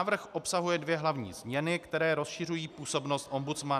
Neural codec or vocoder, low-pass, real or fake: none; 14.4 kHz; real